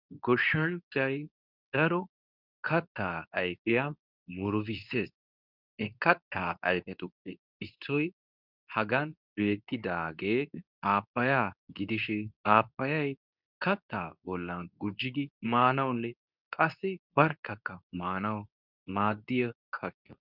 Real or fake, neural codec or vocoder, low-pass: fake; codec, 24 kHz, 0.9 kbps, WavTokenizer, medium speech release version 2; 5.4 kHz